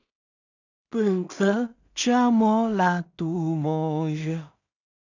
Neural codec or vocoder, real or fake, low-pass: codec, 16 kHz in and 24 kHz out, 0.4 kbps, LongCat-Audio-Codec, two codebook decoder; fake; 7.2 kHz